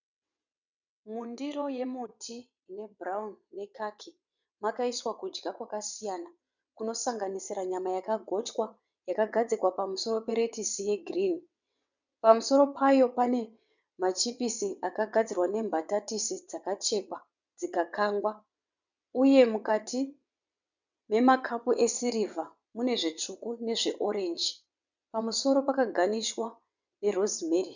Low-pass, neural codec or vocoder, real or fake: 7.2 kHz; vocoder, 22.05 kHz, 80 mel bands, WaveNeXt; fake